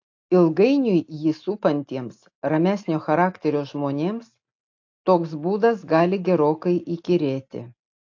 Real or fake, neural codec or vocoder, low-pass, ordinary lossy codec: real; none; 7.2 kHz; AAC, 48 kbps